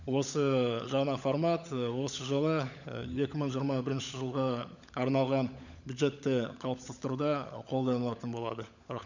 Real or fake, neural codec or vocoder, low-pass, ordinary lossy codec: fake; codec, 16 kHz, 16 kbps, FunCodec, trained on LibriTTS, 50 frames a second; 7.2 kHz; none